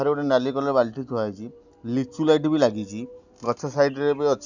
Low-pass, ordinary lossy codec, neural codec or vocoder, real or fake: 7.2 kHz; none; none; real